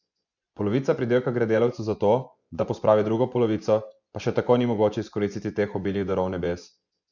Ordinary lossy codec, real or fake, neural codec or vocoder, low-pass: none; fake; vocoder, 24 kHz, 100 mel bands, Vocos; 7.2 kHz